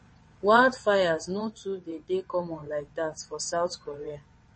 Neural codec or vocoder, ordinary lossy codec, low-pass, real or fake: vocoder, 22.05 kHz, 80 mel bands, WaveNeXt; MP3, 32 kbps; 9.9 kHz; fake